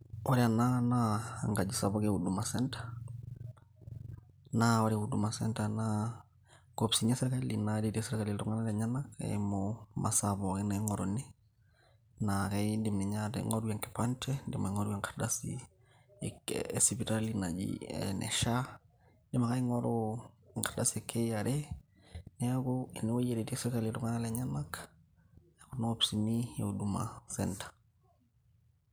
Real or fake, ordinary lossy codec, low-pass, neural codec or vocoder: real; none; none; none